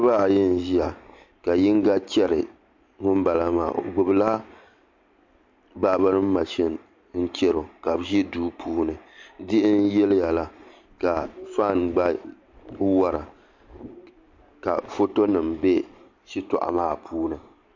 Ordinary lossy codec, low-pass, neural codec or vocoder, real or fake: MP3, 48 kbps; 7.2 kHz; none; real